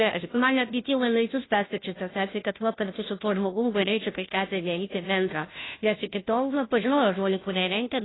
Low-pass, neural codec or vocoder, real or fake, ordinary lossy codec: 7.2 kHz; codec, 16 kHz, 0.5 kbps, FunCodec, trained on Chinese and English, 25 frames a second; fake; AAC, 16 kbps